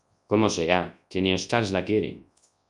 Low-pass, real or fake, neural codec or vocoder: 10.8 kHz; fake; codec, 24 kHz, 0.9 kbps, WavTokenizer, large speech release